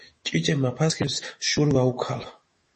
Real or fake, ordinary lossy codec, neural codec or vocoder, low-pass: fake; MP3, 32 kbps; autoencoder, 48 kHz, 128 numbers a frame, DAC-VAE, trained on Japanese speech; 10.8 kHz